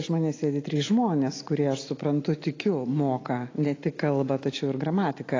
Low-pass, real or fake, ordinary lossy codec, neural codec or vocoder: 7.2 kHz; real; AAC, 32 kbps; none